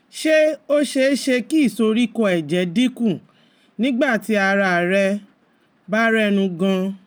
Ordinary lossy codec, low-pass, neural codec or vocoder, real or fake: none; none; none; real